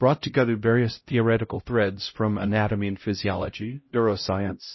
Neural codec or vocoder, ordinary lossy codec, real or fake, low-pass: codec, 16 kHz, 0.5 kbps, X-Codec, HuBERT features, trained on LibriSpeech; MP3, 24 kbps; fake; 7.2 kHz